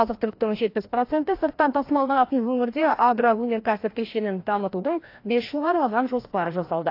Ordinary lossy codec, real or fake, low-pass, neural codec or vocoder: AAC, 32 kbps; fake; 5.4 kHz; codec, 16 kHz, 1 kbps, FreqCodec, larger model